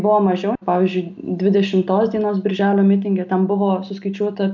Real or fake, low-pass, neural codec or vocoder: real; 7.2 kHz; none